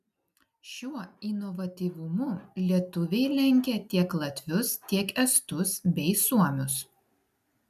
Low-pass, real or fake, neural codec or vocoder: 14.4 kHz; real; none